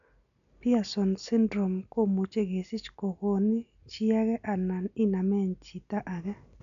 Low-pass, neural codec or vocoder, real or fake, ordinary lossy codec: 7.2 kHz; none; real; none